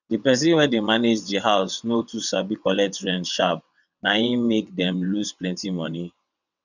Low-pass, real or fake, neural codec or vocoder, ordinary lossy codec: 7.2 kHz; fake; vocoder, 22.05 kHz, 80 mel bands, WaveNeXt; none